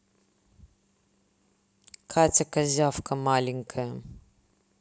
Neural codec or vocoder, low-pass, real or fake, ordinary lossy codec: none; none; real; none